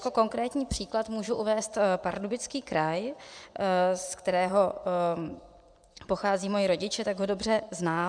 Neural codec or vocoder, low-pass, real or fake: vocoder, 24 kHz, 100 mel bands, Vocos; 9.9 kHz; fake